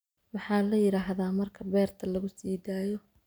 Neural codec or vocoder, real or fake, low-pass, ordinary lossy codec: none; real; none; none